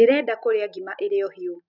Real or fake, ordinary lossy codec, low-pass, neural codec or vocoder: real; none; 5.4 kHz; none